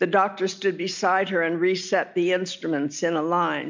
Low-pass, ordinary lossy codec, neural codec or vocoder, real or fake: 7.2 kHz; MP3, 64 kbps; none; real